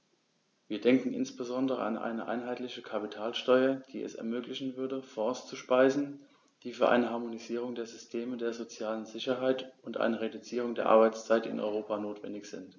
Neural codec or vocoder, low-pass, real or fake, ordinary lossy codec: none; 7.2 kHz; real; none